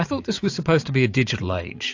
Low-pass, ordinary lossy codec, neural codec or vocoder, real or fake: 7.2 kHz; AAC, 48 kbps; vocoder, 44.1 kHz, 80 mel bands, Vocos; fake